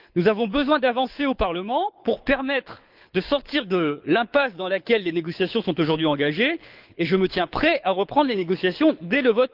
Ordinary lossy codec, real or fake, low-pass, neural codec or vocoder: Opus, 24 kbps; fake; 5.4 kHz; codec, 24 kHz, 6 kbps, HILCodec